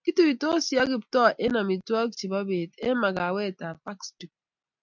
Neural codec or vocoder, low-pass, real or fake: none; 7.2 kHz; real